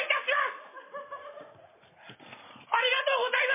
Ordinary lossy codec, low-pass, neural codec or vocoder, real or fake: MP3, 16 kbps; 3.6 kHz; none; real